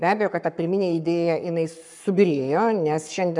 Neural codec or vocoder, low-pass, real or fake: codec, 44.1 kHz, 7.8 kbps, Pupu-Codec; 10.8 kHz; fake